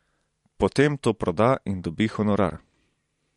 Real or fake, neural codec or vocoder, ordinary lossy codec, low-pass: fake; vocoder, 44.1 kHz, 128 mel bands every 512 samples, BigVGAN v2; MP3, 48 kbps; 19.8 kHz